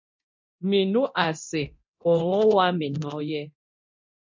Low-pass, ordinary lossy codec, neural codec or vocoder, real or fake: 7.2 kHz; MP3, 48 kbps; codec, 24 kHz, 0.9 kbps, DualCodec; fake